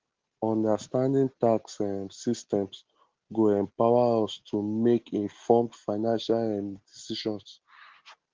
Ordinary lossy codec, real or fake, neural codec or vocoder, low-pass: Opus, 16 kbps; real; none; 7.2 kHz